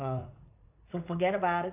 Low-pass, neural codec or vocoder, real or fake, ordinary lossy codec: 3.6 kHz; vocoder, 44.1 kHz, 80 mel bands, Vocos; fake; none